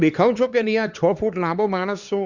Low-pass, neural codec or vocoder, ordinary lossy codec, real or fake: 7.2 kHz; codec, 16 kHz, 2 kbps, X-Codec, HuBERT features, trained on LibriSpeech; Opus, 64 kbps; fake